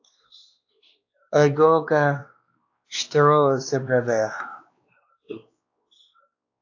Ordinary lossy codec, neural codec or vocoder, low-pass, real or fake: AAC, 48 kbps; codec, 16 kHz, 2 kbps, X-Codec, WavLM features, trained on Multilingual LibriSpeech; 7.2 kHz; fake